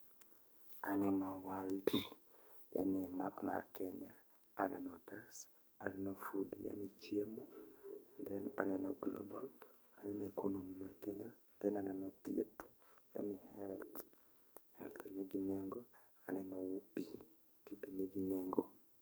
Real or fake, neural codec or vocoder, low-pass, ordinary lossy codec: fake; codec, 44.1 kHz, 2.6 kbps, SNAC; none; none